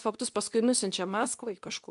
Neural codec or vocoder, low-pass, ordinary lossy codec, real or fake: codec, 24 kHz, 0.9 kbps, WavTokenizer, medium speech release version 2; 10.8 kHz; MP3, 96 kbps; fake